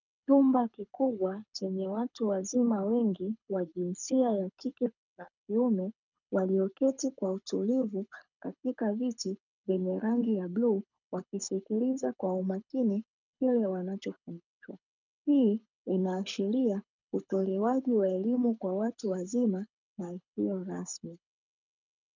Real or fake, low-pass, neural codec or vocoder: fake; 7.2 kHz; codec, 24 kHz, 6 kbps, HILCodec